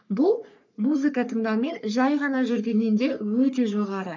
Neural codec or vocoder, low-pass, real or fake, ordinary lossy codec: codec, 44.1 kHz, 3.4 kbps, Pupu-Codec; 7.2 kHz; fake; MP3, 64 kbps